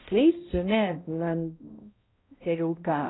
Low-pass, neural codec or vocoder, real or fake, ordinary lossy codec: 7.2 kHz; codec, 16 kHz, 0.5 kbps, X-Codec, HuBERT features, trained on balanced general audio; fake; AAC, 16 kbps